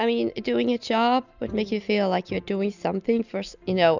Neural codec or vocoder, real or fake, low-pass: none; real; 7.2 kHz